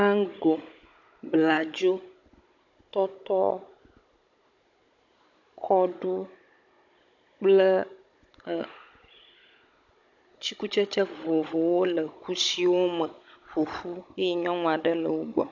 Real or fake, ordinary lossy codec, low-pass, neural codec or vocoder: fake; AAC, 48 kbps; 7.2 kHz; codec, 16 kHz, 16 kbps, FunCodec, trained on Chinese and English, 50 frames a second